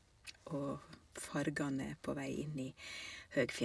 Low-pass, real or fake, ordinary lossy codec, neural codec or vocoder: none; real; none; none